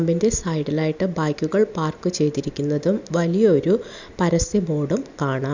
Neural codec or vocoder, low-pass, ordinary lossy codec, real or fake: none; 7.2 kHz; none; real